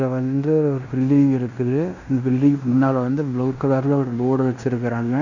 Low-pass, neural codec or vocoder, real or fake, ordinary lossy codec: 7.2 kHz; codec, 16 kHz in and 24 kHz out, 0.9 kbps, LongCat-Audio-Codec, fine tuned four codebook decoder; fake; none